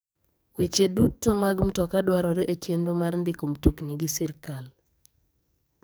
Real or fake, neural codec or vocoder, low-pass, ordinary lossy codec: fake; codec, 44.1 kHz, 2.6 kbps, SNAC; none; none